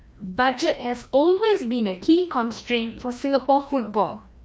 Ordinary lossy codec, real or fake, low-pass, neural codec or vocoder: none; fake; none; codec, 16 kHz, 1 kbps, FreqCodec, larger model